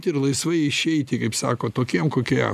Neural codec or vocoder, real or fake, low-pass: none; real; 14.4 kHz